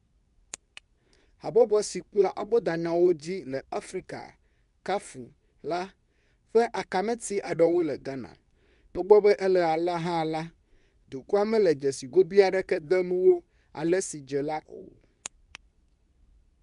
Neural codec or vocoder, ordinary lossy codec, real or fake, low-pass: codec, 24 kHz, 0.9 kbps, WavTokenizer, medium speech release version 2; none; fake; 10.8 kHz